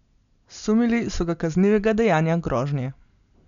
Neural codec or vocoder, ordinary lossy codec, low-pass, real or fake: none; none; 7.2 kHz; real